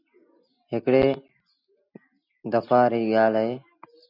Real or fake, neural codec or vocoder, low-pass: real; none; 5.4 kHz